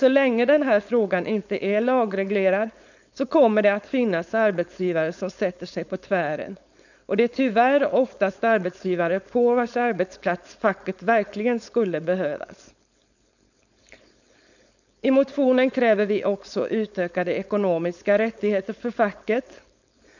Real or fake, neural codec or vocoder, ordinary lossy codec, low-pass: fake; codec, 16 kHz, 4.8 kbps, FACodec; none; 7.2 kHz